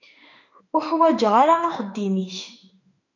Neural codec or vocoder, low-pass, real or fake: autoencoder, 48 kHz, 32 numbers a frame, DAC-VAE, trained on Japanese speech; 7.2 kHz; fake